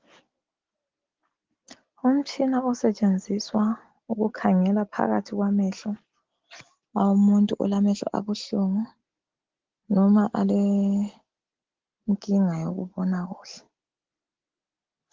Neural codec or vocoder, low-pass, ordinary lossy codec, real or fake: none; 7.2 kHz; Opus, 16 kbps; real